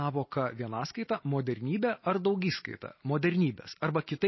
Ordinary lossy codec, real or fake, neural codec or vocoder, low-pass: MP3, 24 kbps; real; none; 7.2 kHz